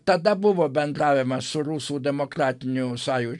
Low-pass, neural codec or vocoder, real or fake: 10.8 kHz; none; real